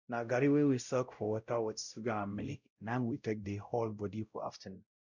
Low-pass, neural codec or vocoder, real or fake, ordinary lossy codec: 7.2 kHz; codec, 16 kHz, 0.5 kbps, X-Codec, WavLM features, trained on Multilingual LibriSpeech; fake; none